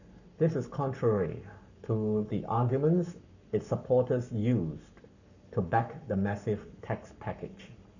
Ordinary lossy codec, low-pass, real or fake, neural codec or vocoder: none; 7.2 kHz; fake; codec, 44.1 kHz, 7.8 kbps, Pupu-Codec